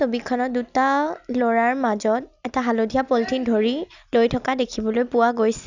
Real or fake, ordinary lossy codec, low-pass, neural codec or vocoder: real; none; 7.2 kHz; none